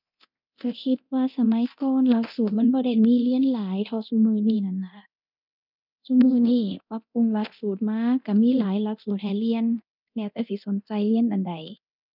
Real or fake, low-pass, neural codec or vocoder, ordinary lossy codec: fake; 5.4 kHz; codec, 24 kHz, 0.9 kbps, DualCodec; none